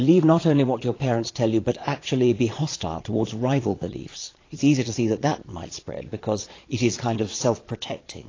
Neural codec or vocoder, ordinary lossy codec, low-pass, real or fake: vocoder, 22.05 kHz, 80 mel bands, Vocos; AAC, 32 kbps; 7.2 kHz; fake